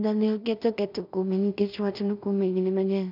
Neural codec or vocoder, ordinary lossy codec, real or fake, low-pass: codec, 16 kHz in and 24 kHz out, 0.4 kbps, LongCat-Audio-Codec, two codebook decoder; none; fake; 5.4 kHz